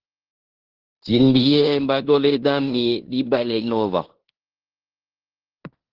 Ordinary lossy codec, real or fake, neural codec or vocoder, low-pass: Opus, 16 kbps; fake; codec, 16 kHz in and 24 kHz out, 0.9 kbps, LongCat-Audio-Codec, fine tuned four codebook decoder; 5.4 kHz